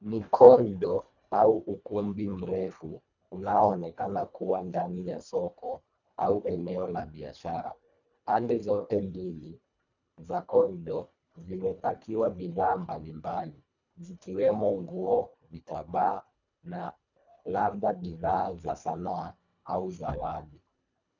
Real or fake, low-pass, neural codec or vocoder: fake; 7.2 kHz; codec, 24 kHz, 1.5 kbps, HILCodec